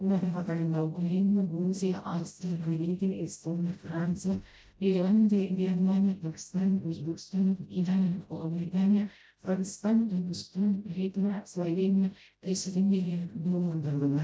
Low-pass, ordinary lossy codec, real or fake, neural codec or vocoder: none; none; fake; codec, 16 kHz, 0.5 kbps, FreqCodec, smaller model